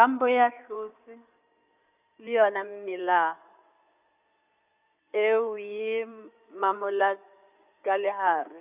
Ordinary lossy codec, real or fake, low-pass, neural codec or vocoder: none; fake; 3.6 kHz; codec, 16 kHz in and 24 kHz out, 2.2 kbps, FireRedTTS-2 codec